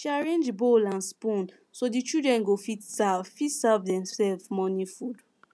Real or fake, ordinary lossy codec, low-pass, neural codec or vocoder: real; none; none; none